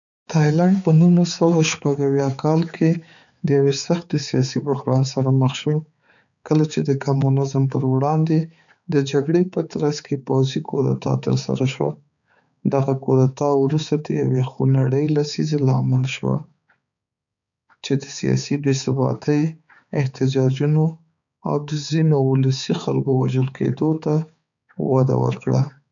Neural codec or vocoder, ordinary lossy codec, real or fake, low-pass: codec, 16 kHz, 4 kbps, X-Codec, HuBERT features, trained on balanced general audio; none; fake; 7.2 kHz